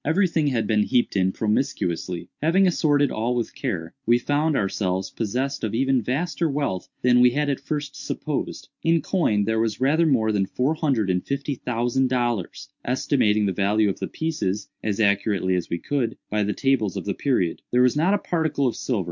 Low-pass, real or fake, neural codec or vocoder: 7.2 kHz; real; none